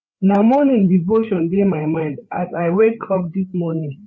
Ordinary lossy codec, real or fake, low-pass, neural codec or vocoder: none; fake; none; codec, 16 kHz, 4 kbps, FreqCodec, larger model